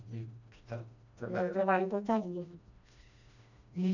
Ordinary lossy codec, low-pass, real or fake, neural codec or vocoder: none; 7.2 kHz; fake; codec, 16 kHz, 1 kbps, FreqCodec, smaller model